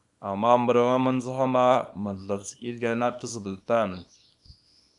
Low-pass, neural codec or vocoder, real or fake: 10.8 kHz; codec, 24 kHz, 0.9 kbps, WavTokenizer, small release; fake